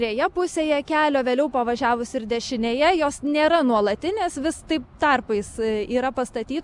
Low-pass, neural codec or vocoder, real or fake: 10.8 kHz; none; real